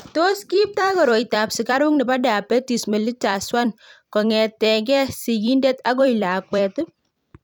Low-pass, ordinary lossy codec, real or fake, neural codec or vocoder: 19.8 kHz; none; fake; vocoder, 44.1 kHz, 128 mel bands every 256 samples, BigVGAN v2